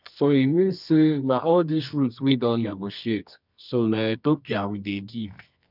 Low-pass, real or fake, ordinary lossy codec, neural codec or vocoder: 5.4 kHz; fake; none; codec, 24 kHz, 0.9 kbps, WavTokenizer, medium music audio release